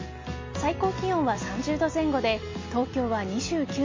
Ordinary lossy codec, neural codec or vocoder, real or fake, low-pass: MP3, 32 kbps; none; real; 7.2 kHz